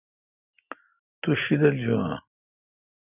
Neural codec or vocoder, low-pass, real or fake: none; 3.6 kHz; real